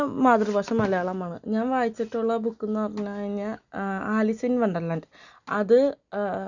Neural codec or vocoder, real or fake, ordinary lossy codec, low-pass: none; real; none; 7.2 kHz